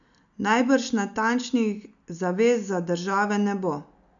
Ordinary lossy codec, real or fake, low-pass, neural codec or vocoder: none; real; 7.2 kHz; none